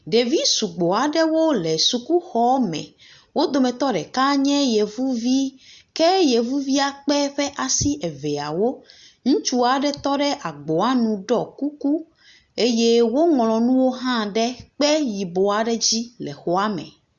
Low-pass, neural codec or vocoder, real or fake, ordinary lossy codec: 7.2 kHz; none; real; Opus, 64 kbps